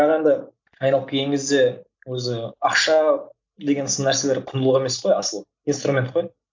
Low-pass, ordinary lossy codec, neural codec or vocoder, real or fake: 7.2 kHz; MP3, 64 kbps; none; real